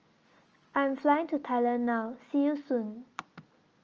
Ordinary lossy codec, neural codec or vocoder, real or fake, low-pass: Opus, 24 kbps; none; real; 7.2 kHz